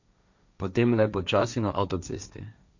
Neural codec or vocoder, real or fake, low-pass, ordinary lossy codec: codec, 16 kHz, 1.1 kbps, Voila-Tokenizer; fake; 7.2 kHz; none